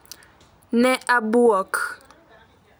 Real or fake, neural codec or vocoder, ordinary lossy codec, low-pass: real; none; none; none